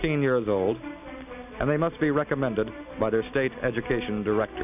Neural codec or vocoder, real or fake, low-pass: none; real; 3.6 kHz